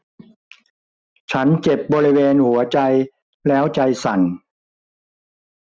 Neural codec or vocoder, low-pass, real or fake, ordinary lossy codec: none; none; real; none